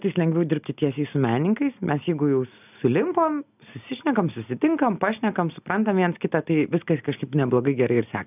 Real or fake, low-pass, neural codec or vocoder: real; 3.6 kHz; none